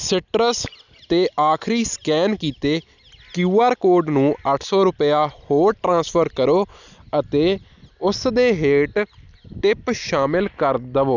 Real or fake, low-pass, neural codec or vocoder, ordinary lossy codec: real; 7.2 kHz; none; none